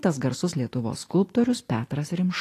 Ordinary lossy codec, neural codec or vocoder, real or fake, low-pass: AAC, 48 kbps; none; real; 14.4 kHz